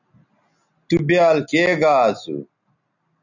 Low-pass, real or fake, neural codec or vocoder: 7.2 kHz; real; none